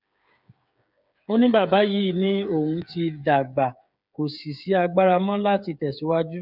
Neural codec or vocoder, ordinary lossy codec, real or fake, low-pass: codec, 16 kHz, 8 kbps, FreqCodec, smaller model; none; fake; 5.4 kHz